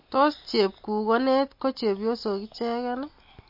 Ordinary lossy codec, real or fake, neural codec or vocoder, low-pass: MP3, 32 kbps; real; none; 5.4 kHz